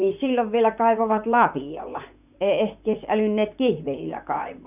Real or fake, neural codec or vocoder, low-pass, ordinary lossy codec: fake; vocoder, 44.1 kHz, 128 mel bands, Pupu-Vocoder; 3.6 kHz; none